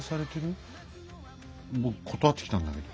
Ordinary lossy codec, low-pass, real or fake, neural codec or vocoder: none; none; real; none